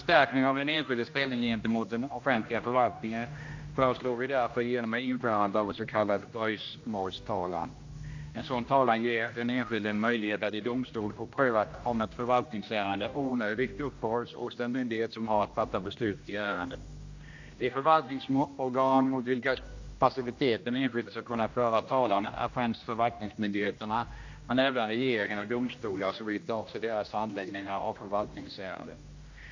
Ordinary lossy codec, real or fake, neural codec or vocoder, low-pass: AAC, 48 kbps; fake; codec, 16 kHz, 1 kbps, X-Codec, HuBERT features, trained on general audio; 7.2 kHz